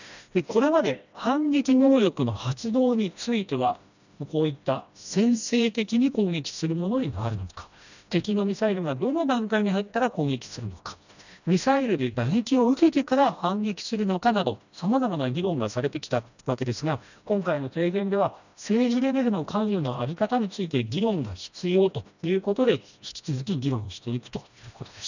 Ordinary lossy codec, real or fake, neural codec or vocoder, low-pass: none; fake; codec, 16 kHz, 1 kbps, FreqCodec, smaller model; 7.2 kHz